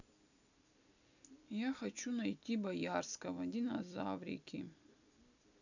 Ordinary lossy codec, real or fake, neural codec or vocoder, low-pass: none; real; none; 7.2 kHz